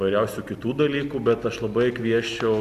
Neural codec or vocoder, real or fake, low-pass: none; real; 14.4 kHz